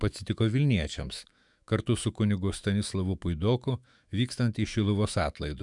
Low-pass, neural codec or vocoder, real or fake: 10.8 kHz; autoencoder, 48 kHz, 128 numbers a frame, DAC-VAE, trained on Japanese speech; fake